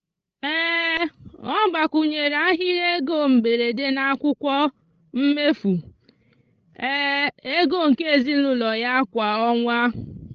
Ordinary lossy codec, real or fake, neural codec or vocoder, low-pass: Opus, 24 kbps; fake; codec, 16 kHz, 16 kbps, FreqCodec, larger model; 7.2 kHz